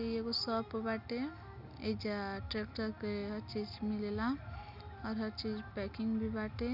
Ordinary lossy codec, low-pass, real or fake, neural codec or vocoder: none; 5.4 kHz; real; none